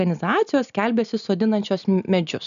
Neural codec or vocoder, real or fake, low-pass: none; real; 7.2 kHz